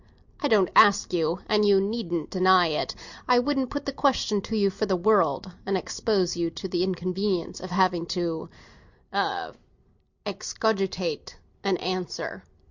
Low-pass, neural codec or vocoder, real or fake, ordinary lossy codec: 7.2 kHz; none; real; Opus, 64 kbps